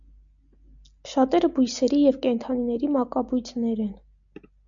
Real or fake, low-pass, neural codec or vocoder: real; 7.2 kHz; none